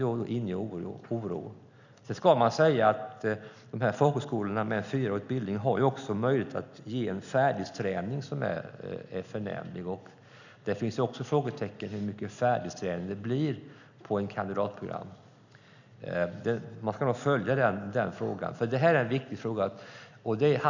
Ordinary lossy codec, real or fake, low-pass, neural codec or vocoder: none; real; 7.2 kHz; none